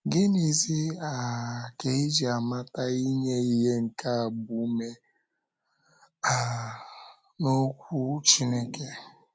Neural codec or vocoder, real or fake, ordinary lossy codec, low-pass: none; real; none; none